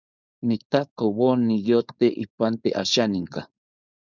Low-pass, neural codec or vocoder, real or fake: 7.2 kHz; codec, 16 kHz, 4.8 kbps, FACodec; fake